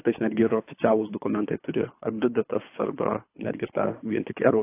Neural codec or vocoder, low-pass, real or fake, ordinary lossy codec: codec, 24 kHz, 3 kbps, HILCodec; 3.6 kHz; fake; AAC, 24 kbps